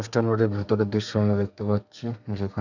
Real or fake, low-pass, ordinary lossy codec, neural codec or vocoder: fake; 7.2 kHz; none; codec, 32 kHz, 1.9 kbps, SNAC